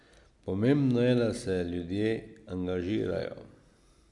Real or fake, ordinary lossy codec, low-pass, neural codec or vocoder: real; MP3, 64 kbps; 10.8 kHz; none